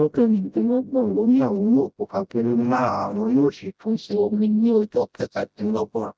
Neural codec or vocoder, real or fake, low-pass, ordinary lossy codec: codec, 16 kHz, 0.5 kbps, FreqCodec, smaller model; fake; none; none